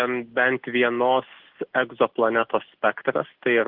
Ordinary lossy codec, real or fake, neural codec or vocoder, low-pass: Opus, 16 kbps; real; none; 5.4 kHz